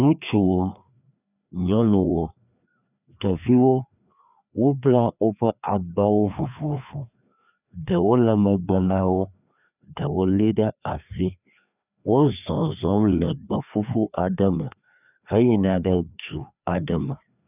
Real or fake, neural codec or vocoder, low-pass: fake; codec, 16 kHz, 2 kbps, FreqCodec, larger model; 3.6 kHz